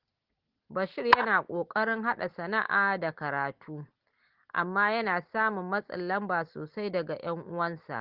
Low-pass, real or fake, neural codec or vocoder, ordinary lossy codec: 5.4 kHz; real; none; Opus, 16 kbps